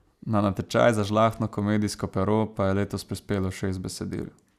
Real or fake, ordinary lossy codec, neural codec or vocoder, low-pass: real; none; none; 14.4 kHz